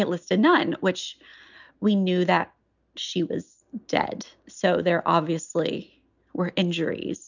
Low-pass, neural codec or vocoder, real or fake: 7.2 kHz; none; real